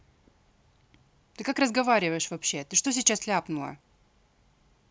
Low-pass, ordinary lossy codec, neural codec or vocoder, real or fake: none; none; none; real